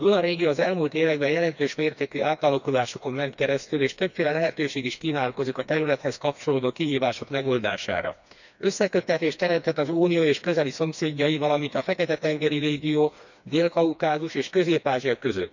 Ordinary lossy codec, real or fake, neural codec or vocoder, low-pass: none; fake; codec, 16 kHz, 2 kbps, FreqCodec, smaller model; 7.2 kHz